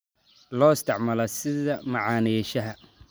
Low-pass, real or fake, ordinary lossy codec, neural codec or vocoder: none; real; none; none